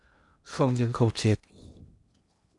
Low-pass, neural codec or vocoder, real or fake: 10.8 kHz; codec, 16 kHz in and 24 kHz out, 0.8 kbps, FocalCodec, streaming, 65536 codes; fake